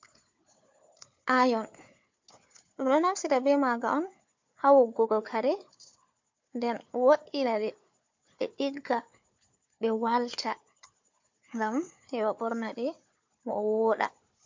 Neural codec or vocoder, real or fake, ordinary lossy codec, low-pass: codec, 16 kHz, 4 kbps, FunCodec, trained on Chinese and English, 50 frames a second; fake; MP3, 48 kbps; 7.2 kHz